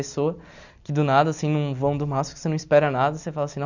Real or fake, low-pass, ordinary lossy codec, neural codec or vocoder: real; 7.2 kHz; none; none